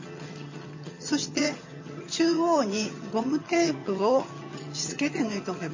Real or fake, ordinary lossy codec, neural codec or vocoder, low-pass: fake; MP3, 32 kbps; vocoder, 22.05 kHz, 80 mel bands, HiFi-GAN; 7.2 kHz